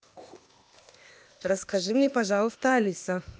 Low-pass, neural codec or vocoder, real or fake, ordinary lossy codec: none; codec, 16 kHz, 0.8 kbps, ZipCodec; fake; none